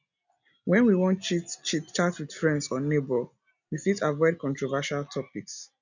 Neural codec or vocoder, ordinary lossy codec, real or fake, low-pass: none; none; real; 7.2 kHz